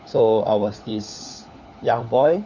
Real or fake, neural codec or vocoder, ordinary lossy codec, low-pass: fake; codec, 16 kHz, 4 kbps, FunCodec, trained on LibriTTS, 50 frames a second; none; 7.2 kHz